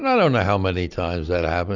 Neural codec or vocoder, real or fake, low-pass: none; real; 7.2 kHz